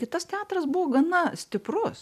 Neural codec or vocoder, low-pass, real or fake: none; 14.4 kHz; real